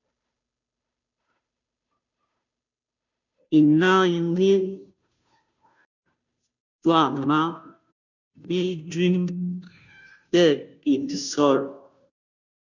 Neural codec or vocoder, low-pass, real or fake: codec, 16 kHz, 0.5 kbps, FunCodec, trained on Chinese and English, 25 frames a second; 7.2 kHz; fake